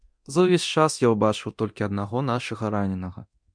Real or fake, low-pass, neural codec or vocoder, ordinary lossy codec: fake; 9.9 kHz; codec, 24 kHz, 0.9 kbps, DualCodec; MP3, 64 kbps